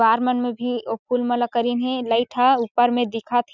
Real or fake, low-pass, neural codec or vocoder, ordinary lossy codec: real; 7.2 kHz; none; none